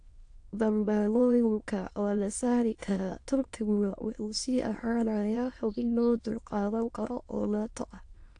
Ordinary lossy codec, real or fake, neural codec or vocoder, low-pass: AAC, 48 kbps; fake; autoencoder, 22.05 kHz, a latent of 192 numbers a frame, VITS, trained on many speakers; 9.9 kHz